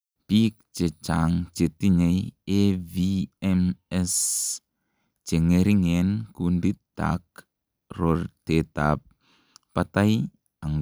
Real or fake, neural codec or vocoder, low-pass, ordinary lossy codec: real; none; none; none